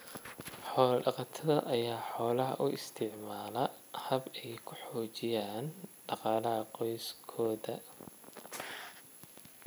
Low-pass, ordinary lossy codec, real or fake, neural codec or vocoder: none; none; real; none